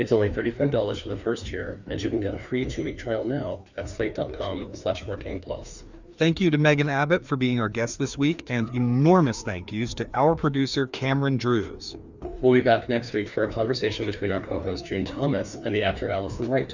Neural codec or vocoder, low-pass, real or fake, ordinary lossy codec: codec, 16 kHz, 2 kbps, FreqCodec, larger model; 7.2 kHz; fake; Opus, 64 kbps